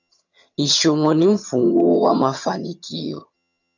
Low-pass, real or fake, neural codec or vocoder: 7.2 kHz; fake; vocoder, 22.05 kHz, 80 mel bands, HiFi-GAN